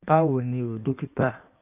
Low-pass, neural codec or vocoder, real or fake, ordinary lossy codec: 3.6 kHz; codec, 16 kHz in and 24 kHz out, 1.1 kbps, FireRedTTS-2 codec; fake; none